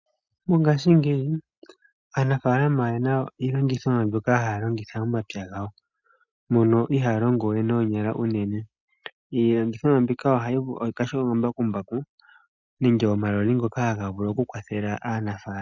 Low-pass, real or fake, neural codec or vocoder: 7.2 kHz; real; none